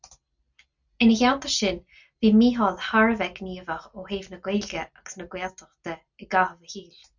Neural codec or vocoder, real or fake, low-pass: none; real; 7.2 kHz